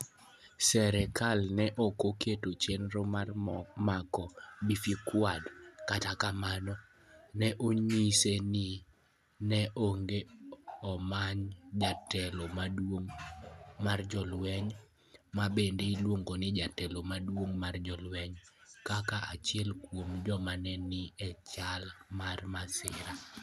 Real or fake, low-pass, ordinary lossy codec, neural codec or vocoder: real; 14.4 kHz; none; none